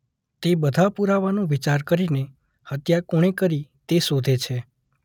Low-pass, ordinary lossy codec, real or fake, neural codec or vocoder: 19.8 kHz; none; real; none